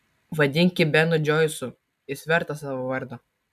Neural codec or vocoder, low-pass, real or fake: none; 14.4 kHz; real